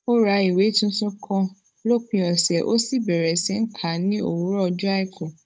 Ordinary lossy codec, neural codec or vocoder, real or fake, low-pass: none; codec, 16 kHz, 16 kbps, FunCodec, trained on Chinese and English, 50 frames a second; fake; none